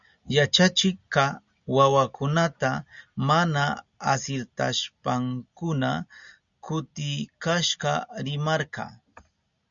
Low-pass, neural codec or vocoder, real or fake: 7.2 kHz; none; real